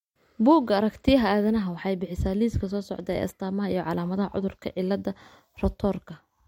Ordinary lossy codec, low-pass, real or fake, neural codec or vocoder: MP3, 64 kbps; 19.8 kHz; real; none